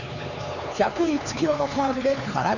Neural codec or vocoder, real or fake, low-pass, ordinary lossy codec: codec, 16 kHz, 4 kbps, X-Codec, HuBERT features, trained on LibriSpeech; fake; 7.2 kHz; none